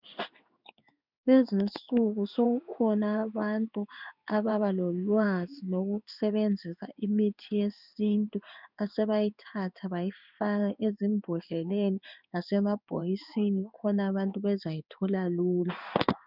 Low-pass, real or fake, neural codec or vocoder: 5.4 kHz; fake; codec, 16 kHz in and 24 kHz out, 1 kbps, XY-Tokenizer